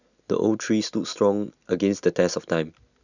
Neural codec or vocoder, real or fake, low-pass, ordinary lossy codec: none; real; 7.2 kHz; none